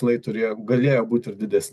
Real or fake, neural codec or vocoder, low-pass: real; none; 14.4 kHz